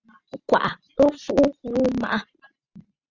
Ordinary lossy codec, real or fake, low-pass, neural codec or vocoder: Opus, 64 kbps; real; 7.2 kHz; none